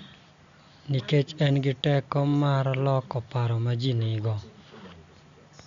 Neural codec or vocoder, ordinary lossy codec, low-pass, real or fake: none; Opus, 64 kbps; 7.2 kHz; real